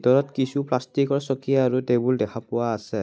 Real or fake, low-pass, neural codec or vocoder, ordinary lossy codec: real; none; none; none